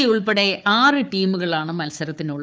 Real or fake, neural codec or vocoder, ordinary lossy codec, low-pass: fake; codec, 16 kHz, 4 kbps, FunCodec, trained on Chinese and English, 50 frames a second; none; none